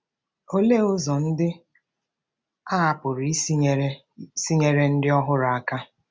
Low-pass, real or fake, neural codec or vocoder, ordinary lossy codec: none; real; none; none